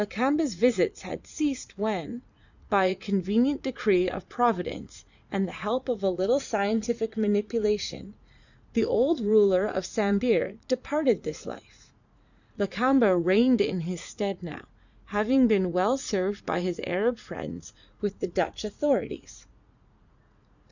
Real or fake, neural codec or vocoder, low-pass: fake; vocoder, 44.1 kHz, 80 mel bands, Vocos; 7.2 kHz